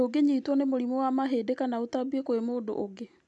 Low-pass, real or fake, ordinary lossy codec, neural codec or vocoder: 10.8 kHz; real; none; none